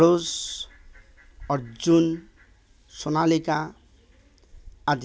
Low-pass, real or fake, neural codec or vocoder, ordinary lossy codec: none; real; none; none